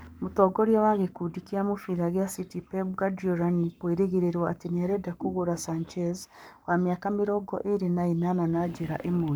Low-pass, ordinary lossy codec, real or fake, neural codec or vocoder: none; none; fake; codec, 44.1 kHz, 7.8 kbps, Pupu-Codec